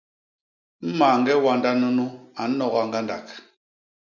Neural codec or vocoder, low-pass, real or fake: none; 7.2 kHz; real